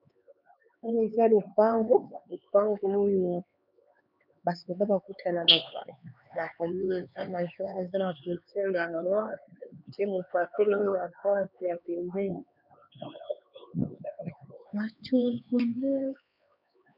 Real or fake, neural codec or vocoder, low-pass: fake; codec, 16 kHz, 4 kbps, X-Codec, HuBERT features, trained on LibriSpeech; 5.4 kHz